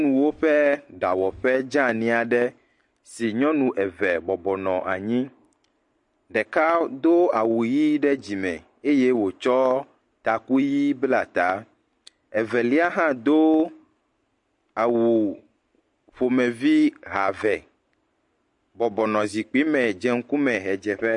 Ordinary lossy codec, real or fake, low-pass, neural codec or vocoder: MP3, 48 kbps; real; 10.8 kHz; none